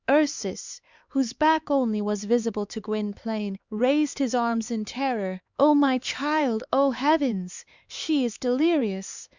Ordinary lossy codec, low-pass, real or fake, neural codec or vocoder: Opus, 64 kbps; 7.2 kHz; fake; codec, 16 kHz, 4 kbps, X-Codec, HuBERT features, trained on LibriSpeech